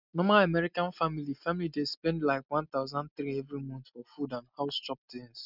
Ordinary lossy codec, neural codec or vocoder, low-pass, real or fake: none; none; 5.4 kHz; real